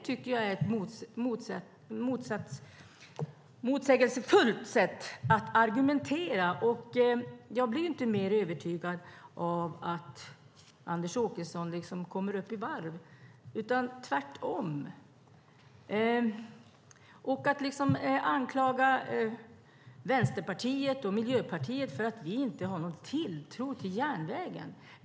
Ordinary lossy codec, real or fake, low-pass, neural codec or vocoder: none; real; none; none